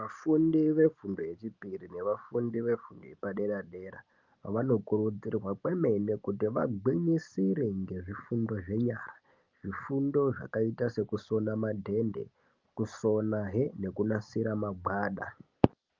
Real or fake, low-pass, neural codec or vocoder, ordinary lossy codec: real; 7.2 kHz; none; Opus, 24 kbps